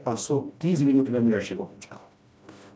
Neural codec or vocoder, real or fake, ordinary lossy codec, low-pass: codec, 16 kHz, 1 kbps, FreqCodec, smaller model; fake; none; none